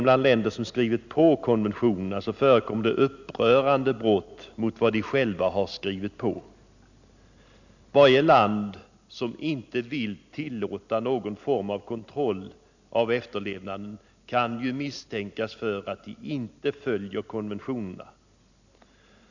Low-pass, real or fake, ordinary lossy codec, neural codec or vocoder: 7.2 kHz; real; none; none